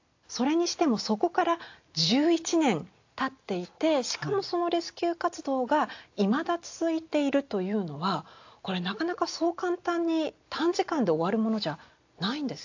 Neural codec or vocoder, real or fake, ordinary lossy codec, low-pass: none; real; AAC, 48 kbps; 7.2 kHz